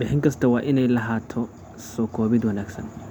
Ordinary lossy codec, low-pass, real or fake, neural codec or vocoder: none; 19.8 kHz; real; none